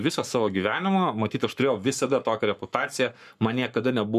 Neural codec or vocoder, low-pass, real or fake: codec, 44.1 kHz, 7.8 kbps, Pupu-Codec; 14.4 kHz; fake